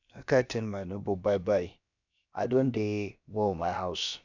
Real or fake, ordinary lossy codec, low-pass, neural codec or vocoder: fake; none; 7.2 kHz; codec, 16 kHz, about 1 kbps, DyCAST, with the encoder's durations